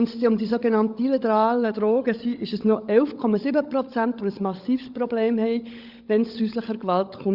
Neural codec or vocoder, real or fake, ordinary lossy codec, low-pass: codec, 16 kHz, 16 kbps, FunCodec, trained on LibriTTS, 50 frames a second; fake; Opus, 64 kbps; 5.4 kHz